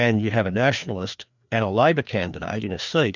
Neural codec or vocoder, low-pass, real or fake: codec, 16 kHz, 2 kbps, FreqCodec, larger model; 7.2 kHz; fake